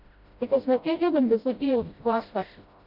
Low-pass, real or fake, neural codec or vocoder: 5.4 kHz; fake; codec, 16 kHz, 0.5 kbps, FreqCodec, smaller model